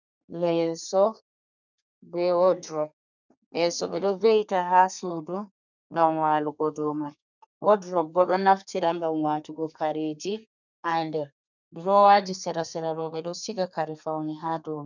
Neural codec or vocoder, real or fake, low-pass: codec, 32 kHz, 1.9 kbps, SNAC; fake; 7.2 kHz